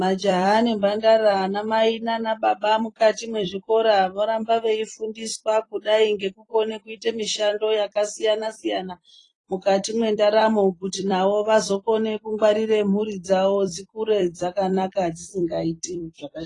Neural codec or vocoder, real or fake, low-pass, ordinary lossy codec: vocoder, 44.1 kHz, 128 mel bands every 256 samples, BigVGAN v2; fake; 10.8 kHz; AAC, 32 kbps